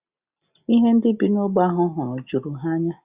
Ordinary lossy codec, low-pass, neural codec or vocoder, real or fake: Opus, 64 kbps; 3.6 kHz; none; real